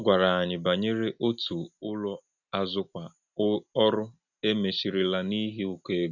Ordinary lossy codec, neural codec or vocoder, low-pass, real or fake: none; none; 7.2 kHz; real